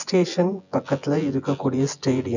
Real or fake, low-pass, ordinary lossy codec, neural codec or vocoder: fake; 7.2 kHz; none; vocoder, 24 kHz, 100 mel bands, Vocos